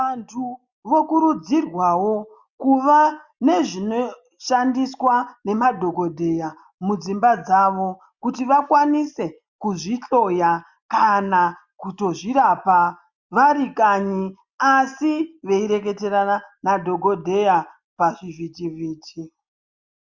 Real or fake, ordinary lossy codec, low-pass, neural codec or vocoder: real; Opus, 64 kbps; 7.2 kHz; none